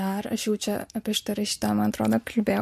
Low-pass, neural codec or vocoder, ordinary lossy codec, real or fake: 14.4 kHz; none; MP3, 64 kbps; real